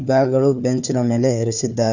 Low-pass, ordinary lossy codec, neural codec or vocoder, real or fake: 7.2 kHz; none; codec, 16 kHz, 4 kbps, FunCodec, trained on Chinese and English, 50 frames a second; fake